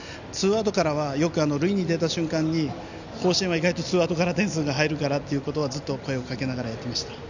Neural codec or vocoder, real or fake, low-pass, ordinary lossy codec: none; real; 7.2 kHz; none